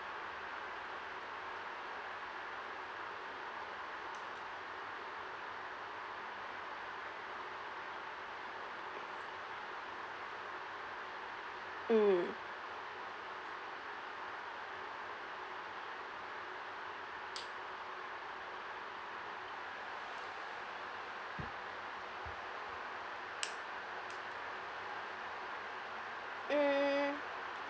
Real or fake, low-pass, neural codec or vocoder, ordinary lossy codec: real; none; none; none